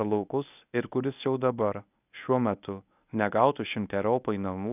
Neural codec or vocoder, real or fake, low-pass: codec, 24 kHz, 0.9 kbps, WavTokenizer, medium speech release version 1; fake; 3.6 kHz